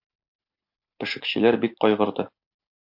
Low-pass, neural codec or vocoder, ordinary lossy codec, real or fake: 5.4 kHz; none; AAC, 48 kbps; real